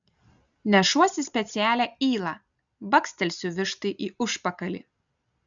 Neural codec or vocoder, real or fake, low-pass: none; real; 7.2 kHz